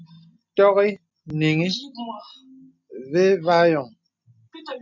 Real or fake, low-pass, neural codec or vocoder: real; 7.2 kHz; none